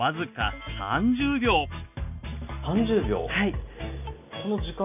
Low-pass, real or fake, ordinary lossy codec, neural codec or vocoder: 3.6 kHz; real; none; none